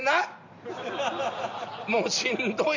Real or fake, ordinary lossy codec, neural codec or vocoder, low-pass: real; none; none; 7.2 kHz